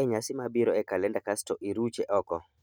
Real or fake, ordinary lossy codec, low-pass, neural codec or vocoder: real; none; 19.8 kHz; none